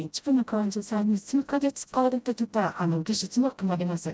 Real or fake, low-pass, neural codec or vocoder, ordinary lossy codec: fake; none; codec, 16 kHz, 0.5 kbps, FreqCodec, smaller model; none